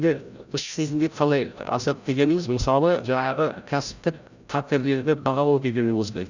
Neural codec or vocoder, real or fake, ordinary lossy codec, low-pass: codec, 16 kHz, 0.5 kbps, FreqCodec, larger model; fake; none; 7.2 kHz